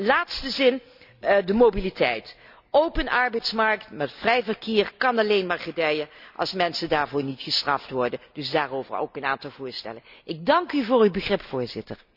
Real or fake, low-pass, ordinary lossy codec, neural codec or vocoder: real; 5.4 kHz; none; none